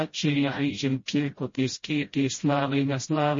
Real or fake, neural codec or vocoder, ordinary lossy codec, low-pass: fake; codec, 16 kHz, 0.5 kbps, FreqCodec, smaller model; MP3, 32 kbps; 7.2 kHz